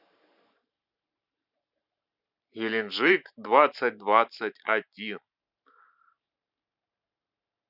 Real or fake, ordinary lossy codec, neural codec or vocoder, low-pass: fake; none; vocoder, 44.1 kHz, 128 mel bands every 512 samples, BigVGAN v2; 5.4 kHz